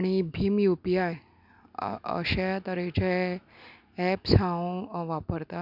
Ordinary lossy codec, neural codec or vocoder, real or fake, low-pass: none; none; real; 5.4 kHz